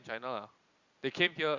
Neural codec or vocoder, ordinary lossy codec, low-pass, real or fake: none; Opus, 64 kbps; 7.2 kHz; real